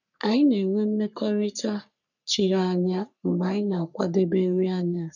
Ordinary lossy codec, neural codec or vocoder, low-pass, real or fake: none; codec, 44.1 kHz, 3.4 kbps, Pupu-Codec; 7.2 kHz; fake